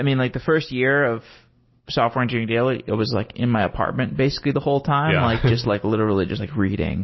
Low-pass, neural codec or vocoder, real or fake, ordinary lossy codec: 7.2 kHz; none; real; MP3, 24 kbps